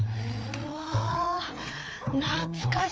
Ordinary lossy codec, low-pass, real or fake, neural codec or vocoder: none; none; fake; codec, 16 kHz, 4 kbps, FreqCodec, larger model